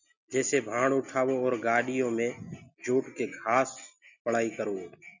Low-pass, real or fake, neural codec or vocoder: 7.2 kHz; real; none